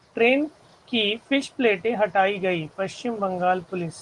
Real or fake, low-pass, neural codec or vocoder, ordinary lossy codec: real; 10.8 kHz; none; Opus, 24 kbps